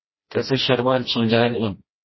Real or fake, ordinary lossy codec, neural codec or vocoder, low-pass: fake; MP3, 24 kbps; codec, 16 kHz, 1 kbps, FreqCodec, smaller model; 7.2 kHz